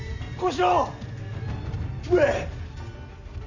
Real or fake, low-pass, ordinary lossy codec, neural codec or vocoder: real; 7.2 kHz; none; none